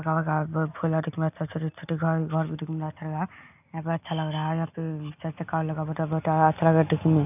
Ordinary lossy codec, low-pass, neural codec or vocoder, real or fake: none; 3.6 kHz; none; real